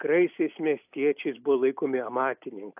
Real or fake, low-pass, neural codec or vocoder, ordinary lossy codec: real; 3.6 kHz; none; AAC, 32 kbps